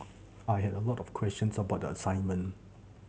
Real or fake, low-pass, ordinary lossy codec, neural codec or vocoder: real; none; none; none